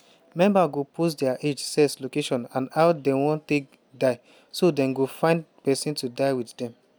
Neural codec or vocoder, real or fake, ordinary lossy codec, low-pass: none; real; none; none